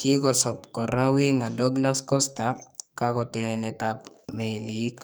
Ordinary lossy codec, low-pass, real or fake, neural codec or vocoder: none; none; fake; codec, 44.1 kHz, 2.6 kbps, SNAC